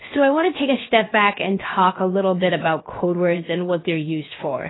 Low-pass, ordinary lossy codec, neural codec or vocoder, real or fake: 7.2 kHz; AAC, 16 kbps; codec, 16 kHz, 0.7 kbps, FocalCodec; fake